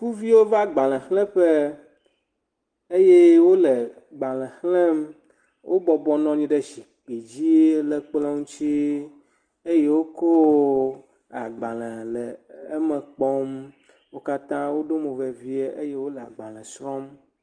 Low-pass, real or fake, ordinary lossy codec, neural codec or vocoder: 9.9 kHz; real; Opus, 24 kbps; none